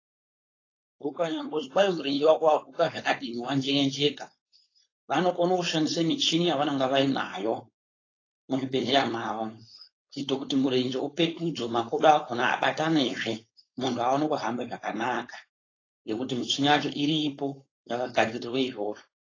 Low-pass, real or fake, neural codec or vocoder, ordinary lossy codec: 7.2 kHz; fake; codec, 16 kHz, 4.8 kbps, FACodec; AAC, 32 kbps